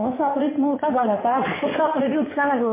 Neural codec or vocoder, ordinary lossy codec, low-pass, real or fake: codec, 16 kHz in and 24 kHz out, 2.2 kbps, FireRedTTS-2 codec; MP3, 16 kbps; 3.6 kHz; fake